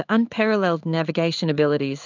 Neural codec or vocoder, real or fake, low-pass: codec, 16 kHz in and 24 kHz out, 1 kbps, XY-Tokenizer; fake; 7.2 kHz